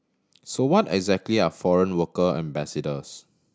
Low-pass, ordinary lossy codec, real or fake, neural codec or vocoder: none; none; real; none